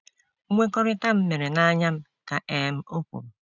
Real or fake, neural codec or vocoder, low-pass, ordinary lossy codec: real; none; 7.2 kHz; none